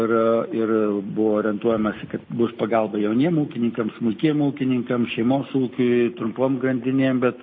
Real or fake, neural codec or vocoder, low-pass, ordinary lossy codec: real; none; 7.2 kHz; MP3, 24 kbps